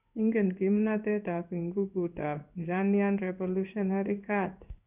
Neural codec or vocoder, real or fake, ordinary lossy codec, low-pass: codec, 16 kHz in and 24 kHz out, 1 kbps, XY-Tokenizer; fake; none; 3.6 kHz